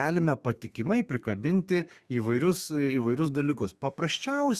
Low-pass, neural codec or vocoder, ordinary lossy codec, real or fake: 14.4 kHz; codec, 32 kHz, 1.9 kbps, SNAC; Opus, 64 kbps; fake